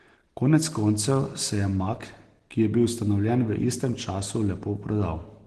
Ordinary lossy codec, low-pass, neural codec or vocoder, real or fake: Opus, 16 kbps; 10.8 kHz; none; real